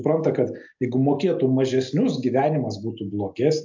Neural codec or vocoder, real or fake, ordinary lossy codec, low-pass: none; real; MP3, 64 kbps; 7.2 kHz